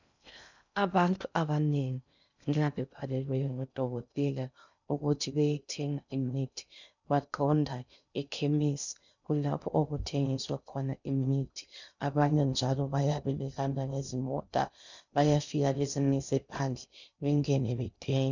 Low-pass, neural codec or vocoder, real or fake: 7.2 kHz; codec, 16 kHz in and 24 kHz out, 0.6 kbps, FocalCodec, streaming, 2048 codes; fake